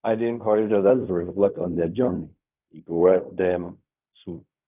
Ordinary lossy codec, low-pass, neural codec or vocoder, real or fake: none; 3.6 kHz; codec, 16 kHz in and 24 kHz out, 0.4 kbps, LongCat-Audio-Codec, fine tuned four codebook decoder; fake